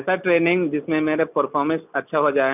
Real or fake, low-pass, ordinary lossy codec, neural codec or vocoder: real; 3.6 kHz; none; none